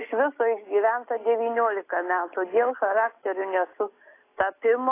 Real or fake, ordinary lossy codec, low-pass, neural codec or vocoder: real; AAC, 24 kbps; 3.6 kHz; none